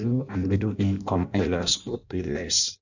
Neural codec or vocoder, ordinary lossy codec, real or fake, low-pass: codec, 16 kHz in and 24 kHz out, 0.6 kbps, FireRedTTS-2 codec; none; fake; 7.2 kHz